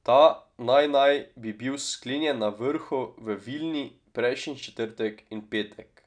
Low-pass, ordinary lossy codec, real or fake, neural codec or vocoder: 9.9 kHz; none; real; none